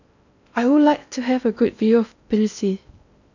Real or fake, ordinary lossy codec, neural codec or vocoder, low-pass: fake; none; codec, 16 kHz in and 24 kHz out, 0.6 kbps, FocalCodec, streaming, 4096 codes; 7.2 kHz